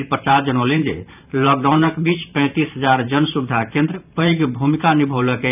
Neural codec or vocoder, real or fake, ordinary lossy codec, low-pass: vocoder, 44.1 kHz, 128 mel bands every 512 samples, BigVGAN v2; fake; none; 3.6 kHz